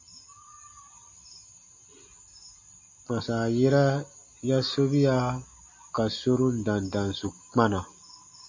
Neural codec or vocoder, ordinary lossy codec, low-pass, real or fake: none; MP3, 48 kbps; 7.2 kHz; real